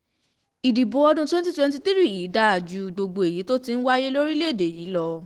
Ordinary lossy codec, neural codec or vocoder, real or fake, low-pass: Opus, 16 kbps; autoencoder, 48 kHz, 128 numbers a frame, DAC-VAE, trained on Japanese speech; fake; 19.8 kHz